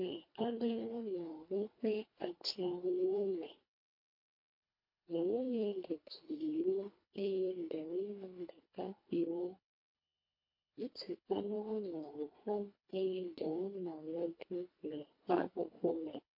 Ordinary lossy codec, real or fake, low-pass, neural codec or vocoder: MP3, 32 kbps; fake; 5.4 kHz; codec, 24 kHz, 1.5 kbps, HILCodec